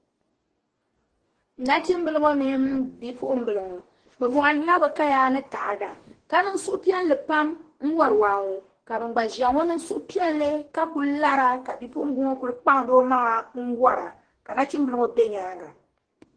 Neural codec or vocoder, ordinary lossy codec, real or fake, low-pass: codec, 44.1 kHz, 2.6 kbps, DAC; Opus, 16 kbps; fake; 9.9 kHz